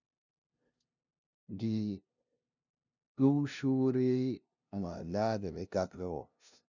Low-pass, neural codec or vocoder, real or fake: 7.2 kHz; codec, 16 kHz, 0.5 kbps, FunCodec, trained on LibriTTS, 25 frames a second; fake